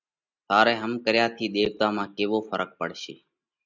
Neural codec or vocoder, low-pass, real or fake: none; 7.2 kHz; real